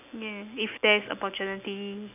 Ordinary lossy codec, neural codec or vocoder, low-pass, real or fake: none; none; 3.6 kHz; real